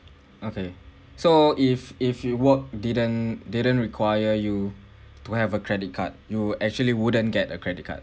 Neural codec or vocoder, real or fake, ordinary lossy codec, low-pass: none; real; none; none